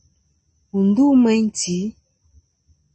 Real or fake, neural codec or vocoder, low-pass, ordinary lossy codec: real; none; 9.9 kHz; MP3, 32 kbps